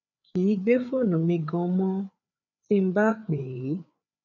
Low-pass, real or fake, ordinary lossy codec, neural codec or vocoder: 7.2 kHz; fake; none; codec, 16 kHz, 4 kbps, FreqCodec, larger model